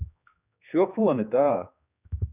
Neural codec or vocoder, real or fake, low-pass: codec, 16 kHz, 2 kbps, X-Codec, HuBERT features, trained on general audio; fake; 3.6 kHz